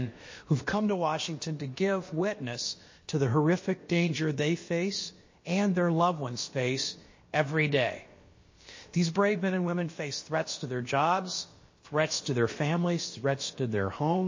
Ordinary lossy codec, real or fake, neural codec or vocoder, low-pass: MP3, 32 kbps; fake; codec, 16 kHz, about 1 kbps, DyCAST, with the encoder's durations; 7.2 kHz